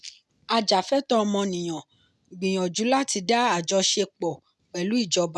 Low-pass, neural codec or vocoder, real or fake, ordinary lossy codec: none; none; real; none